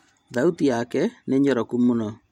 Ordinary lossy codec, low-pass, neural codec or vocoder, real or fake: MP3, 64 kbps; 19.8 kHz; vocoder, 44.1 kHz, 128 mel bands every 256 samples, BigVGAN v2; fake